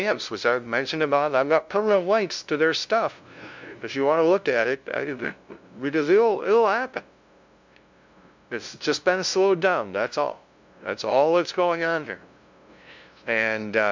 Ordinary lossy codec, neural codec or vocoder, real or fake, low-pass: MP3, 64 kbps; codec, 16 kHz, 0.5 kbps, FunCodec, trained on LibriTTS, 25 frames a second; fake; 7.2 kHz